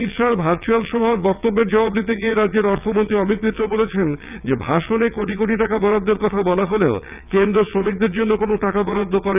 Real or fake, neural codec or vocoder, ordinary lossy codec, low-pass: fake; vocoder, 22.05 kHz, 80 mel bands, WaveNeXt; none; 3.6 kHz